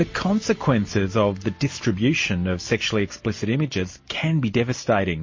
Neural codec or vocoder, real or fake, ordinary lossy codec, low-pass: none; real; MP3, 32 kbps; 7.2 kHz